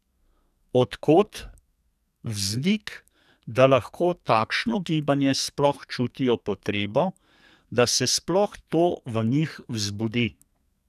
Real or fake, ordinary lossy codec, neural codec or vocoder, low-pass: fake; none; codec, 44.1 kHz, 2.6 kbps, SNAC; 14.4 kHz